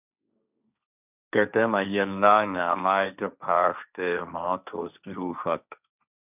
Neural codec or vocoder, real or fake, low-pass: codec, 16 kHz, 1.1 kbps, Voila-Tokenizer; fake; 3.6 kHz